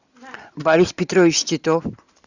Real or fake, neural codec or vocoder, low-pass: real; none; 7.2 kHz